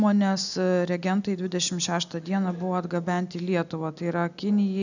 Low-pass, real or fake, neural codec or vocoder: 7.2 kHz; real; none